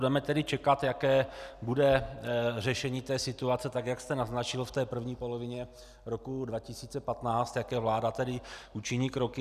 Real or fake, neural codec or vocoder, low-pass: real; none; 14.4 kHz